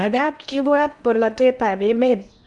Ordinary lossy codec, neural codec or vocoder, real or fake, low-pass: none; codec, 16 kHz in and 24 kHz out, 0.8 kbps, FocalCodec, streaming, 65536 codes; fake; 10.8 kHz